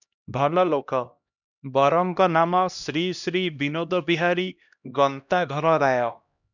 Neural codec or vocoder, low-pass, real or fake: codec, 16 kHz, 1 kbps, X-Codec, HuBERT features, trained on LibriSpeech; 7.2 kHz; fake